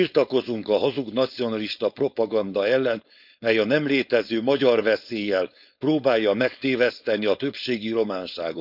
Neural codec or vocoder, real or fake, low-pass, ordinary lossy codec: codec, 16 kHz, 4.8 kbps, FACodec; fake; 5.4 kHz; none